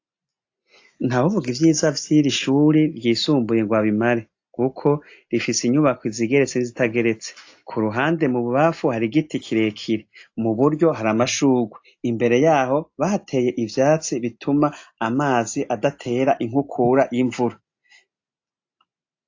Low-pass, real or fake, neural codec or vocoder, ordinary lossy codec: 7.2 kHz; real; none; AAC, 48 kbps